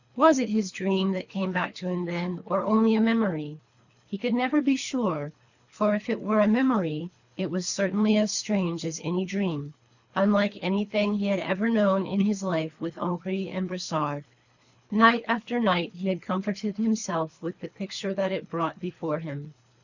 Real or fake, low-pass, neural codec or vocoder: fake; 7.2 kHz; codec, 24 kHz, 3 kbps, HILCodec